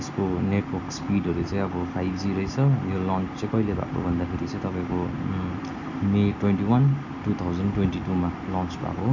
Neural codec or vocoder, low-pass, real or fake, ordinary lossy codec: none; 7.2 kHz; real; none